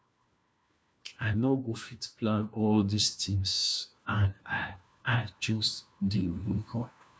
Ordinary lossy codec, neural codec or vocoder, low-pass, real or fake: none; codec, 16 kHz, 1 kbps, FunCodec, trained on LibriTTS, 50 frames a second; none; fake